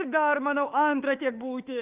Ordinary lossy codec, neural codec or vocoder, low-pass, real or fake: Opus, 24 kbps; autoencoder, 48 kHz, 32 numbers a frame, DAC-VAE, trained on Japanese speech; 3.6 kHz; fake